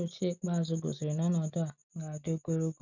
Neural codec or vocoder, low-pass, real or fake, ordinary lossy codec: none; 7.2 kHz; real; none